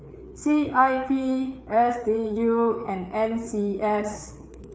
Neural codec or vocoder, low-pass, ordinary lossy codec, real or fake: codec, 16 kHz, 4 kbps, FreqCodec, larger model; none; none; fake